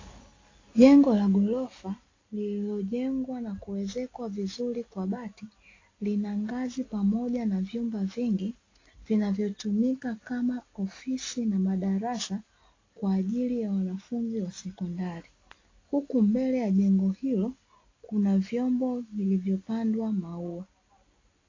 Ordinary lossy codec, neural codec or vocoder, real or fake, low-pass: AAC, 32 kbps; none; real; 7.2 kHz